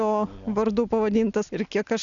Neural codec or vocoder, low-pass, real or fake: none; 7.2 kHz; real